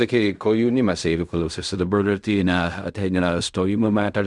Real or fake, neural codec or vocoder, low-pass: fake; codec, 16 kHz in and 24 kHz out, 0.4 kbps, LongCat-Audio-Codec, fine tuned four codebook decoder; 10.8 kHz